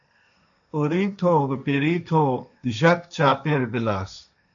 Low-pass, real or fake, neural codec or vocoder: 7.2 kHz; fake; codec, 16 kHz, 1.1 kbps, Voila-Tokenizer